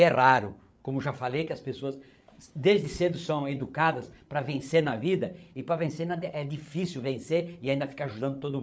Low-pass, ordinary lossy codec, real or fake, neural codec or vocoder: none; none; fake; codec, 16 kHz, 8 kbps, FreqCodec, larger model